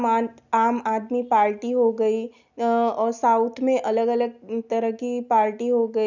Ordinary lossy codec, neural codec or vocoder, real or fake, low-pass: none; none; real; 7.2 kHz